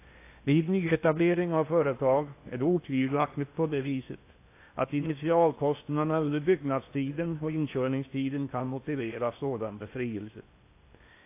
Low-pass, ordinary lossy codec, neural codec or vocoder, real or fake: 3.6 kHz; AAC, 24 kbps; codec, 16 kHz in and 24 kHz out, 0.8 kbps, FocalCodec, streaming, 65536 codes; fake